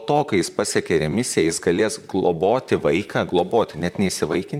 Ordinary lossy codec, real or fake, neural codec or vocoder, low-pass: MP3, 96 kbps; fake; vocoder, 44.1 kHz, 128 mel bands, Pupu-Vocoder; 19.8 kHz